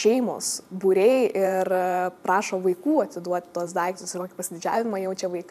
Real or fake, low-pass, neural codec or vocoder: fake; 14.4 kHz; vocoder, 44.1 kHz, 128 mel bands every 512 samples, BigVGAN v2